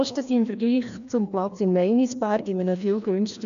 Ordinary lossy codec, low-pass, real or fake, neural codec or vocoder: none; 7.2 kHz; fake; codec, 16 kHz, 1 kbps, FreqCodec, larger model